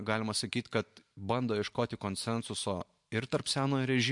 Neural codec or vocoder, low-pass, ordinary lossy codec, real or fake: vocoder, 44.1 kHz, 128 mel bands every 512 samples, BigVGAN v2; 10.8 kHz; MP3, 64 kbps; fake